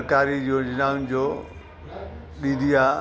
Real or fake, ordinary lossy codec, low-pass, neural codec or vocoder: real; none; none; none